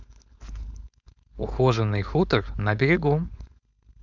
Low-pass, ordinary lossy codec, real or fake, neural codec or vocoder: 7.2 kHz; none; fake; codec, 16 kHz, 4.8 kbps, FACodec